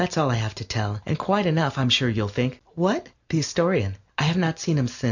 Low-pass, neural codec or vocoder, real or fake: 7.2 kHz; none; real